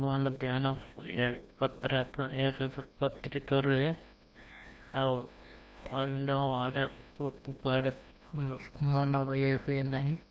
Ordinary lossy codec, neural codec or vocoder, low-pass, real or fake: none; codec, 16 kHz, 1 kbps, FreqCodec, larger model; none; fake